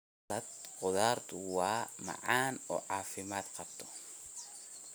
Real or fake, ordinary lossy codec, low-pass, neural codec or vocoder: real; none; none; none